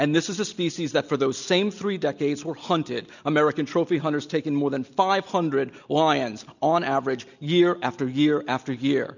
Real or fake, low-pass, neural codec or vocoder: real; 7.2 kHz; none